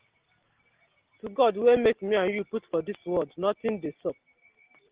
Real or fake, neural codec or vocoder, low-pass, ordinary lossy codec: real; none; 3.6 kHz; Opus, 16 kbps